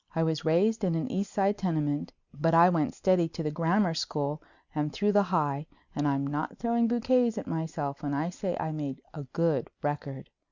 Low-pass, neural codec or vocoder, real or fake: 7.2 kHz; none; real